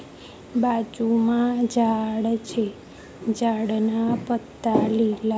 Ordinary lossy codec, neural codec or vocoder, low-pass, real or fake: none; none; none; real